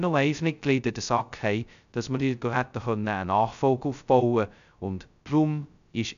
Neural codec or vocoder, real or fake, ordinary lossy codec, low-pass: codec, 16 kHz, 0.2 kbps, FocalCodec; fake; none; 7.2 kHz